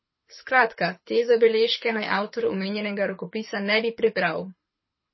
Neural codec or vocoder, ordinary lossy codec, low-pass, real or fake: codec, 24 kHz, 6 kbps, HILCodec; MP3, 24 kbps; 7.2 kHz; fake